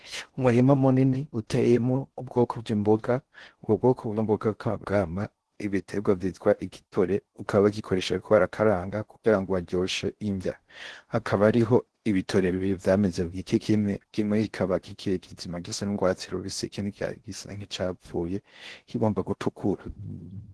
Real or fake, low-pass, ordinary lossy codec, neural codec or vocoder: fake; 10.8 kHz; Opus, 16 kbps; codec, 16 kHz in and 24 kHz out, 0.6 kbps, FocalCodec, streaming, 4096 codes